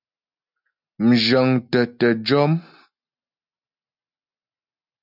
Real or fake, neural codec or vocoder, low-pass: real; none; 5.4 kHz